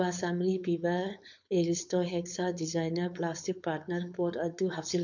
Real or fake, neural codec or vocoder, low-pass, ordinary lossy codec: fake; codec, 16 kHz, 4.8 kbps, FACodec; 7.2 kHz; none